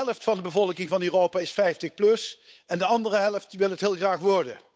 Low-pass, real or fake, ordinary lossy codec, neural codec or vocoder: none; fake; none; codec, 16 kHz, 8 kbps, FunCodec, trained on Chinese and English, 25 frames a second